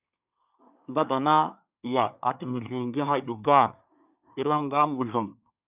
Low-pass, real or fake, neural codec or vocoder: 3.6 kHz; fake; codec, 24 kHz, 1 kbps, SNAC